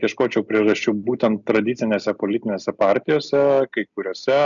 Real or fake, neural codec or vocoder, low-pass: real; none; 7.2 kHz